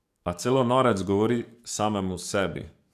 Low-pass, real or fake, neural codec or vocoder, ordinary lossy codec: 14.4 kHz; fake; codec, 44.1 kHz, 7.8 kbps, DAC; none